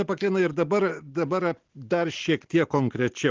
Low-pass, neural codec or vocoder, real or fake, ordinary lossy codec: 7.2 kHz; none; real; Opus, 16 kbps